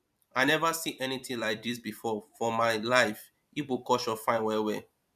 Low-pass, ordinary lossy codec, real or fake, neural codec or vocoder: 14.4 kHz; MP3, 96 kbps; fake; vocoder, 44.1 kHz, 128 mel bands every 256 samples, BigVGAN v2